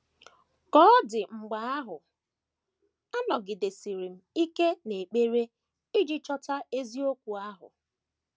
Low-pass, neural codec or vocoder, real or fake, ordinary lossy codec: none; none; real; none